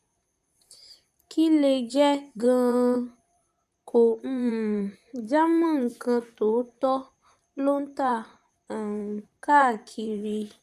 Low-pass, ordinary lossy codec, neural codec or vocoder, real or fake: 14.4 kHz; none; vocoder, 44.1 kHz, 128 mel bands, Pupu-Vocoder; fake